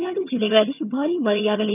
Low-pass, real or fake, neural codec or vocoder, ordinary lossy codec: 3.6 kHz; fake; vocoder, 22.05 kHz, 80 mel bands, HiFi-GAN; none